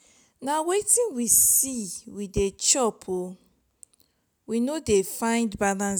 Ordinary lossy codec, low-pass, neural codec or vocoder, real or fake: none; none; none; real